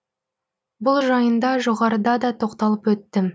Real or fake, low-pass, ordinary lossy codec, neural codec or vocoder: real; none; none; none